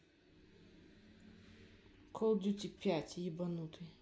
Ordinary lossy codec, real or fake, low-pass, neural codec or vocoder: none; real; none; none